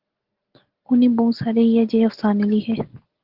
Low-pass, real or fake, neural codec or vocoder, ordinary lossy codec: 5.4 kHz; real; none; Opus, 16 kbps